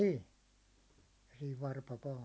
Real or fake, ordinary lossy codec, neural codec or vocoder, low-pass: real; none; none; none